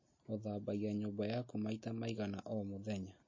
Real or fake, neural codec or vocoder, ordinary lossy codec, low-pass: real; none; MP3, 32 kbps; 7.2 kHz